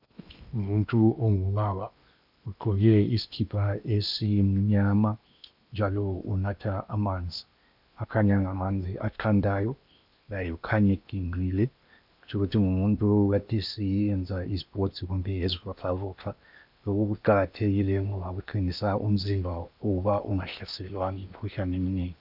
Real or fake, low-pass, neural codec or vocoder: fake; 5.4 kHz; codec, 16 kHz in and 24 kHz out, 0.8 kbps, FocalCodec, streaming, 65536 codes